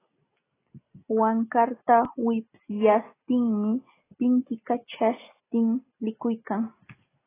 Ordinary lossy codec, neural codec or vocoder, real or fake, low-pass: AAC, 16 kbps; none; real; 3.6 kHz